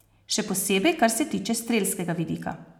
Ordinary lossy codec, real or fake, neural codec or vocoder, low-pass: none; real; none; 19.8 kHz